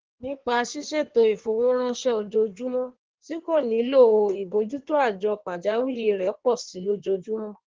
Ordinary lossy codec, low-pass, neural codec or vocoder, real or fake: Opus, 16 kbps; 7.2 kHz; codec, 16 kHz in and 24 kHz out, 1.1 kbps, FireRedTTS-2 codec; fake